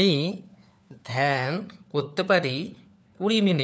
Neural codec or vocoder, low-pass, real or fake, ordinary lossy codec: codec, 16 kHz, 4 kbps, FunCodec, trained on LibriTTS, 50 frames a second; none; fake; none